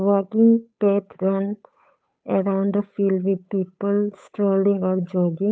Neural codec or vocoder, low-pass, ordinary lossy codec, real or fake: codec, 16 kHz, 8 kbps, FunCodec, trained on Chinese and English, 25 frames a second; none; none; fake